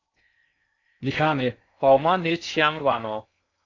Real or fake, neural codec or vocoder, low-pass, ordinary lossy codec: fake; codec, 16 kHz in and 24 kHz out, 0.6 kbps, FocalCodec, streaming, 2048 codes; 7.2 kHz; AAC, 48 kbps